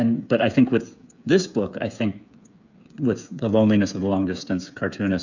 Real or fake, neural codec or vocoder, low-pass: fake; codec, 16 kHz, 8 kbps, FreqCodec, smaller model; 7.2 kHz